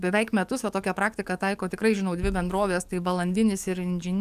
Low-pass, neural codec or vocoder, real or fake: 14.4 kHz; codec, 44.1 kHz, 7.8 kbps, DAC; fake